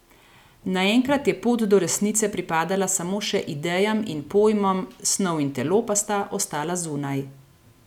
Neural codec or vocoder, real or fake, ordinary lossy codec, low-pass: none; real; none; 19.8 kHz